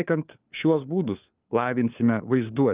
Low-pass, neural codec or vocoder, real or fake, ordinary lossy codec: 3.6 kHz; codec, 16 kHz, 4 kbps, FunCodec, trained on LibriTTS, 50 frames a second; fake; Opus, 24 kbps